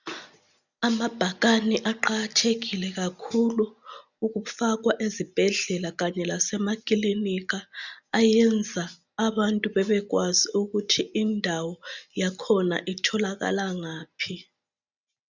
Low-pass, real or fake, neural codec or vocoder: 7.2 kHz; real; none